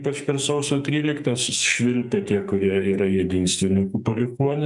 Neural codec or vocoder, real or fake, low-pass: codec, 44.1 kHz, 2.6 kbps, SNAC; fake; 10.8 kHz